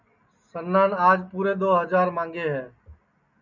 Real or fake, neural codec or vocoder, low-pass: real; none; 7.2 kHz